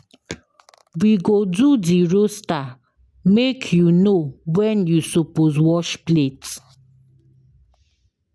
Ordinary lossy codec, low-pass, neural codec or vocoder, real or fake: none; none; none; real